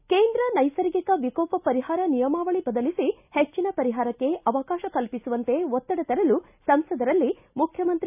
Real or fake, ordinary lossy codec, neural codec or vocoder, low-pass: real; none; none; 3.6 kHz